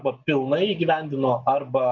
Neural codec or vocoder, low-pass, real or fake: none; 7.2 kHz; real